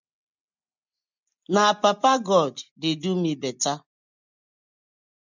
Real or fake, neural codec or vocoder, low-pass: real; none; 7.2 kHz